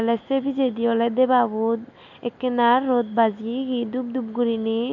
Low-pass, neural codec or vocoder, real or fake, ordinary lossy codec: 7.2 kHz; none; real; none